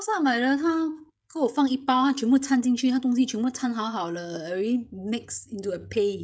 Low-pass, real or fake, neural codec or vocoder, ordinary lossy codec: none; fake; codec, 16 kHz, 16 kbps, FreqCodec, smaller model; none